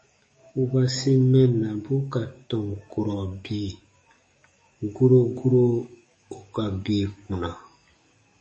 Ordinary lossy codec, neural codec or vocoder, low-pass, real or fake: MP3, 32 kbps; codec, 44.1 kHz, 7.8 kbps, DAC; 10.8 kHz; fake